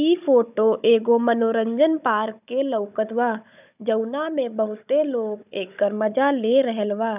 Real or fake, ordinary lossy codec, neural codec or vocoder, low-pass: fake; none; codec, 16 kHz, 16 kbps, FunCodec, trained on Chinese and English, 50 frames a second; 3.6 kHz